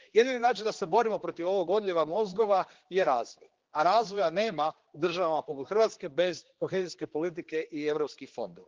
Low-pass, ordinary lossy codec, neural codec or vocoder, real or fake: 7.2 kHz; Opus, 16 kbps; codec, 16 kHz, 2 kbps, X-Codec, HuBERT features, trained on general audio; fake